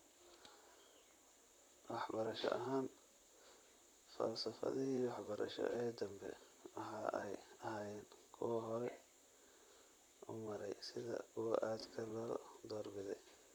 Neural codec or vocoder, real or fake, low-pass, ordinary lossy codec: vocoder, 44.1 kHz, 128 mel bands, Pupu-Vocoder; fake; none; none